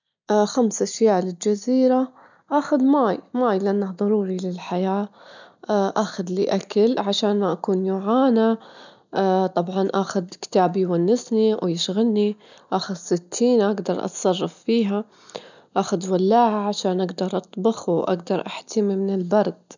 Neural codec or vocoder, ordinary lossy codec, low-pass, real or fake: none; none; 7.2 kHz; real